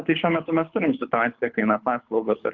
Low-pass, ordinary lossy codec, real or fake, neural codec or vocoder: 7.2 kHz; Opus, 24 kbps; fake; codec, 24 kHz, 6 kbps, HILCodec